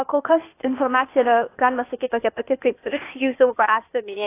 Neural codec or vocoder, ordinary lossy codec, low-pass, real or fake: codec, 16 kHz, 0.8 kbps, ZipCodec; AAC, 24 kbps; 3.6 kHz; fake